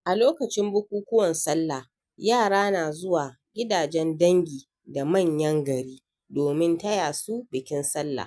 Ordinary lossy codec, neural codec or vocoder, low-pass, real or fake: none; none; none; real